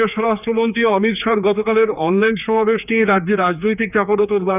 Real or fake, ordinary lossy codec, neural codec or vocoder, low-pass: fake; none; codec, 16 kHz, 4 kbps, X-Codec, HuBERT features, trained on general audio; 3.6 kHz